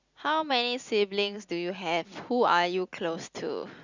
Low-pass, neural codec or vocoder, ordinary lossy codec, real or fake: 7.2 kHz; none; Opus, 64 kbps; real